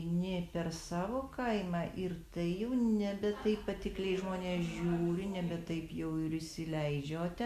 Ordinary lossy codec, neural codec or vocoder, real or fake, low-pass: Opus, 64 kbps; none; real; 14.4 kHz